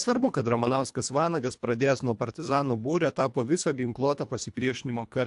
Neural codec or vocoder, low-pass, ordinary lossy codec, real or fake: codec, 24 kHz, 1.5 kbps, HILCodec; 10.8 kHz; AAC, 64 kbps; fake